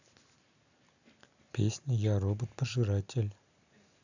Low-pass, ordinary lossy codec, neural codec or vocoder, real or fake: 7.2 kHz; none; none; real